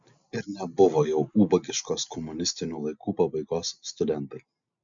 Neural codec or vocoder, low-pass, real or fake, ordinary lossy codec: none; 7.2 kHz; real; MP3, 64 kbps